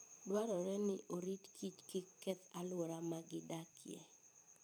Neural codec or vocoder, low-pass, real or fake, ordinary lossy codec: vocoder, 44.1 kHz, 128 mel bands every 512 samples, BigVGAN v2; none; fake; none